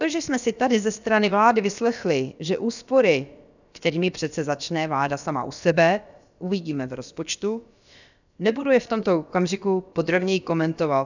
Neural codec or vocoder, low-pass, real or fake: codec, 16 kHz, about 1 kbps, DyCAST, with the encoder's durations; 7.2 kHz; fake